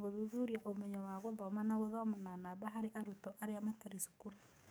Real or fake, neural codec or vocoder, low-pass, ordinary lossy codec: fake; codec, 44.1 kHz, 7.8 kbps, DAC; none; none